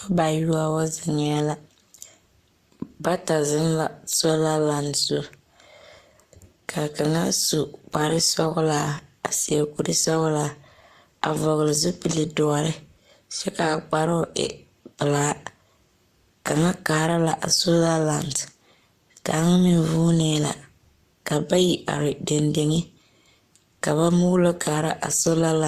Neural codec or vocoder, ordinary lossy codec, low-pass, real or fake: codec, 44.1 kHz, 7.8 kbps, Pupu-Codec; Opus, 64 kbps; 14.4 kHz; fake